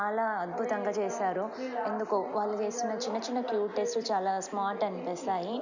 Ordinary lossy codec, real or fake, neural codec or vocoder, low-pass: none; real; none; 7.2 kHz